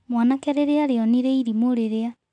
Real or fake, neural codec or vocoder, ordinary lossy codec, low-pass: real; none; none; 9.9 kHz